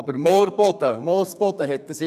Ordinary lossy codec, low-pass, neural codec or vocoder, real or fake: none; 14.4 kHz; codec, 32 kHz, 1.9 kbps, SNAC; fake